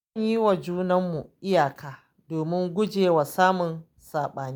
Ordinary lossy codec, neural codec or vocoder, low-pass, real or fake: none; none; none; real